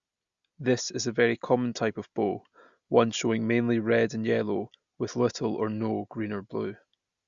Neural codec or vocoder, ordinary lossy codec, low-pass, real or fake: none; Opus, 64 kbps; 7.2 kHz; real